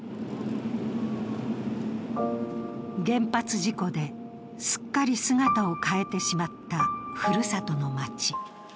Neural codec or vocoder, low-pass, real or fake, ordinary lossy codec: none; none; real; none